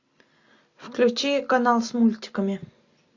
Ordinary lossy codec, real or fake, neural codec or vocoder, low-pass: AAC, 48 kbps; real; none; 7.2 kHz